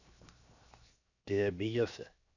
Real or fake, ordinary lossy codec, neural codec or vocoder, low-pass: fake; none; codec, 16 kHz, 0.7 kbps, FocalCodec; 7.2 kHz